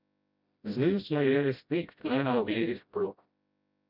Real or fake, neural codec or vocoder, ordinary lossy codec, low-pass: fake; codec, 16 kHz, 0.5 kbps, FreqCodec, smaller model; none; 5.4 kHz